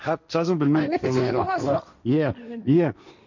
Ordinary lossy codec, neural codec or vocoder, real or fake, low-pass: none; codec, 16 kHz, 1.1 kbps, Voila-Tokenizer; fake; 7.2 kHz